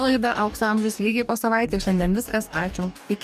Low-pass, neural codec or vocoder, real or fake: 14.4 kHz; codec, 44.1 kHz, 2.6 kbps, DAC; fake